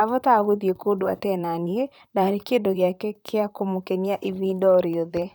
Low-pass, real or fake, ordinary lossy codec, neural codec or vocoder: none; fake; none; vocoder, 44.1 kHz, 128 mel bands, Pupu-Vocoder